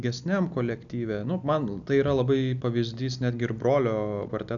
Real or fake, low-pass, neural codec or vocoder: real; 7.2 kHz; none